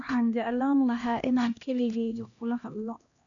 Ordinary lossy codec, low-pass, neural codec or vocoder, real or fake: none; 7.2 kHz; codec, 16 kHz, 1 kbps, X-Codec, HuBERT features, trained on LibriSpeech; fake